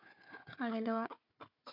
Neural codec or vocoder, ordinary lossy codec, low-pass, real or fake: codec, 16 kHz, 4 kbps, FunCodec, trained on Chinese and English, 50 frames a second; none; 5.4 kHz; fake